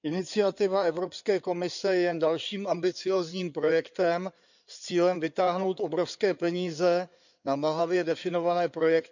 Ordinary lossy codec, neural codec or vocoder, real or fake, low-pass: none; codec, 16 kHz in and 24 kHz out, 2.2 kbps, FireRedTTS-2 codec; fake; 7.2 kHz